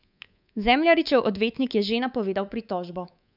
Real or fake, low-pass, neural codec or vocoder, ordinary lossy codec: fake; 5.4 kHz; codec, 24 kHz, 3.1 kbps, DualCodec; none